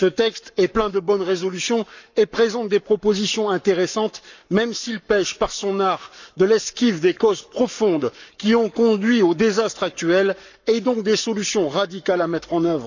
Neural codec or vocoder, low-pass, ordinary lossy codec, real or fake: codec, 44.1 kHz, 7.8 kbps, Pupu-Codec; 7.2 kHz; none; fake